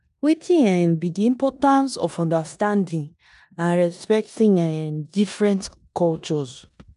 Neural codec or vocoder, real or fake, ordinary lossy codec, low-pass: codec, 16 kHz in and 24 kHz out, 0.9 kbps, LongCat-Audio-Codec, four codebook decoder; fake; none; 10.8 kHz